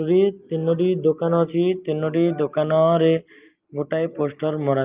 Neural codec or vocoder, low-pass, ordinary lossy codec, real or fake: none; 3.6 kHz; Opus, 24 kbps; real